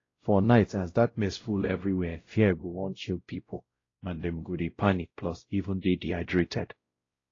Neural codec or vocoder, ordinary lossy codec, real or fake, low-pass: codec, 16 kHz, 0.5 kbps, X-Codec, WavLM features, trained on Multilingual LibriSpeech; AAC, 32 kbps; fake; 7.2 kHz